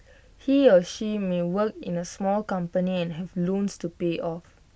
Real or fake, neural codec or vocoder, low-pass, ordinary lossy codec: real; none; none; none